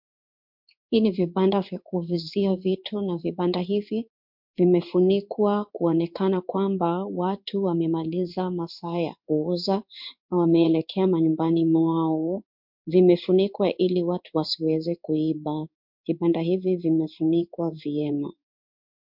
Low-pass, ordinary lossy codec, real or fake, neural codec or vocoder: 5.4 kHz; MP3, 48 kbps; fake; codec, 16 kHz in and 24 kHz out, 1 kbps, XY-Tokenizer